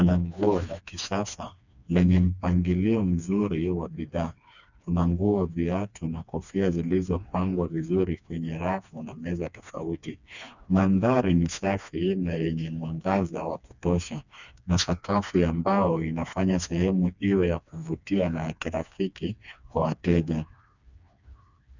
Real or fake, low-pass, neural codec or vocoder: fake; 7.2 kHz; codec, 16 kHz, 2 kbps, FreqCodec, smaller model